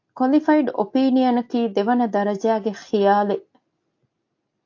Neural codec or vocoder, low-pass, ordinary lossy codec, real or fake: none; 7.2 kHz; AAC, 48 kbps; real